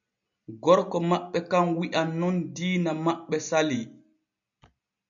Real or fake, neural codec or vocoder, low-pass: real; none; 7.2 kHz